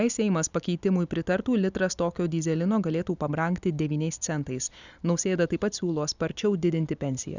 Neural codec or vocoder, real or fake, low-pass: none; real; 7.2 kHz